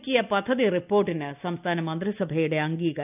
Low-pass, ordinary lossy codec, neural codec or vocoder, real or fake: 3.6 kHz; none; none; real